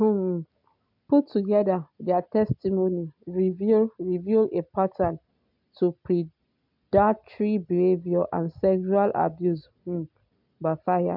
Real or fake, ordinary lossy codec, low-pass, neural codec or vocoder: real; MP3, 48 kbps; 5.4 kHz; none